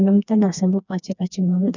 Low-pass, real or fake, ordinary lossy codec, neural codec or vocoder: 7.2 kHz; fake; none; codec, 16 kHz, 2 kbps, FreqCodec, smaller model